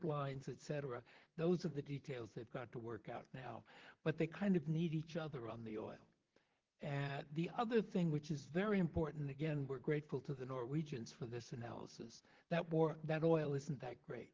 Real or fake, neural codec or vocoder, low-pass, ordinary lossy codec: fake; vocoder, 44.1 kHz, 128 mel bands, Pupu-Vocoder; 7.2 kHz; Opus, 16 kbps